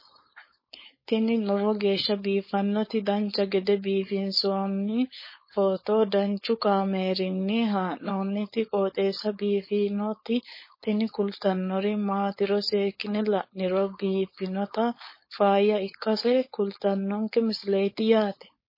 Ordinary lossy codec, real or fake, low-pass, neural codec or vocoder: MP3, 24 kbps; fake; 5.4 kHz; codec, 16 kHz, 4.8 kbps, FACodec